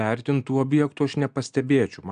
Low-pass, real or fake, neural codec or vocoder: 9.9 kHz; real; none